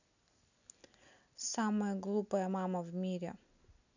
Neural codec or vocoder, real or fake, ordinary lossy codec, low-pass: none; real; none; 7.2 kHz